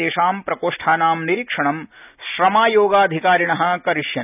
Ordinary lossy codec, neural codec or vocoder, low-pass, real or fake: none; none; 3.6 kHz; real